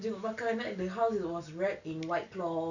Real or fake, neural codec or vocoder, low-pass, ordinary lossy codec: real; none; 7.2 kHz; none